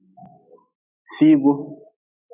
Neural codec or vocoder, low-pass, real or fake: none; 3.6 kHz; real